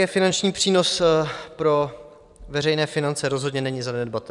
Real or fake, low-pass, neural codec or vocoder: fake; 10.8 kHz; vocoder, 44.1 kHz, 128 mel bands every 256 samples, BigVGAN v2